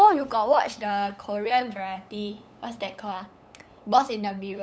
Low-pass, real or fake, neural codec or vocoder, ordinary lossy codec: none; fake; codec, 16 kHz, 8 kbps, FunCodec, trained on LibriTTS, 25 frames a second; none